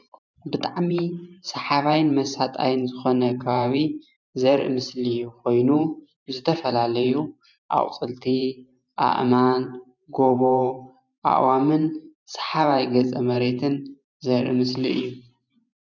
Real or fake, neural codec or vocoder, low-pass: fake; vocoder, 44.1 kHz, 128 mel bands every 512 samples, BigVGAN v2; 7.2 kHz